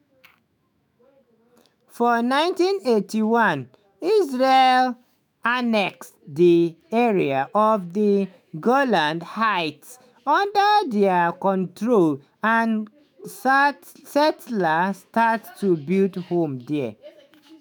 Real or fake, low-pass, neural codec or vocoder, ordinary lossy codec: fake; none; autoencoder, 48 kHz, 128 numbers a frame, DAC-VAE, trained on Japanese speech; none